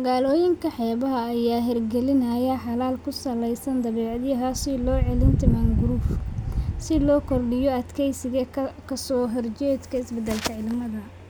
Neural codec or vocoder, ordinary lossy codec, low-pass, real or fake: none; none; none; real